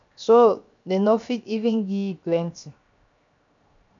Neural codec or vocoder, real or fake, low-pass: codec, 16 kHz, 0.7 kbps, FocalCodec; fake; 7.2 kHz